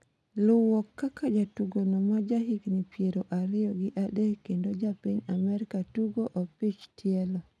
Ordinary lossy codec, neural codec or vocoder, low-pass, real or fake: none; none; none; real